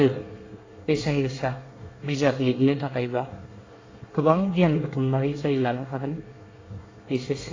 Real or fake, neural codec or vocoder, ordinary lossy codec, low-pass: fake; codec, 24 kHz, 1 kbps, SNAC; AAC, 32 kbps; 7.2 kHz